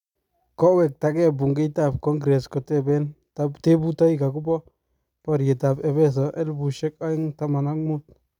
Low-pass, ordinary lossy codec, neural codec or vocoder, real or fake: 19.8 kHz; none; none; real